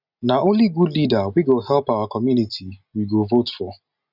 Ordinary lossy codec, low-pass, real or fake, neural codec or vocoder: none; 5.4 kHz; real; none